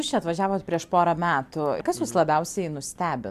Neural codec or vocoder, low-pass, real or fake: none; 14.4 kHz; real